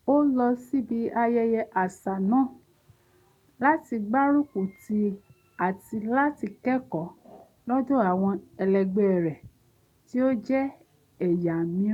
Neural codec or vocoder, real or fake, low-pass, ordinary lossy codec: none; real; 19.8 kHz; none